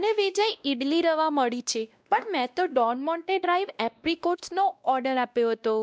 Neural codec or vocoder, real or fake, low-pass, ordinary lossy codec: codec, 16 kHz, 1 kbps, X-Codec, WavLM features, trained on Multilingual LibriSpeech; fake; none; none